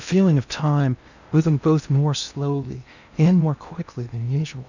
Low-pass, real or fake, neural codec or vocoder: 7.2 kHz; fake; codec, 16 kHz in and 24 kHz out, 0.6 kbps, FocalCodec, streaming, 2048 codes